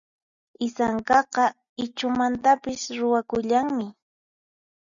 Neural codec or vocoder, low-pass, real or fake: none; 7.2 kHz; real